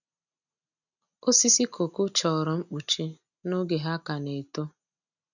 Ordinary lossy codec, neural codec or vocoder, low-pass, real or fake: none; none; 7.2 kHz; real